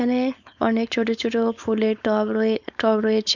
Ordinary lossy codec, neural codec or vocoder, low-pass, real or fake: none; codec, 16 kHz, 4.8 kbps, FACodec; 7.2 kHz; fake